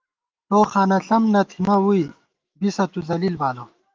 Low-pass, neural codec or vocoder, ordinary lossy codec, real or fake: 7.2 kHz; autoencoder, 48 kHz, 128 numbers a frame, DAC-VAE, trained on Japanese speech; Opus, 24 kbps; fake